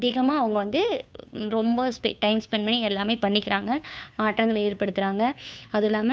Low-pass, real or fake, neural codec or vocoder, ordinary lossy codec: none; fake; codec, 16 kHz, 2 kbps, FunCodec, trained on Chinese and English, 25 frames a second; none